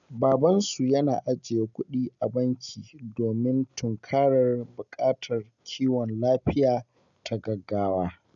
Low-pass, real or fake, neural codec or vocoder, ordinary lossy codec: 7.2 kHz; real; none; none